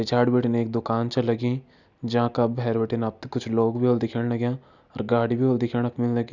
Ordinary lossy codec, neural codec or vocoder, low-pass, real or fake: none; none; 7.2 kHz; real